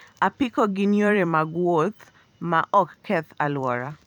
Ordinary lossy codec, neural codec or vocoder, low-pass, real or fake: none; vocoder, 44.1 kHz, 128 mel bands every 512 samples, BigVGAN v2; 19.8 kHz; fake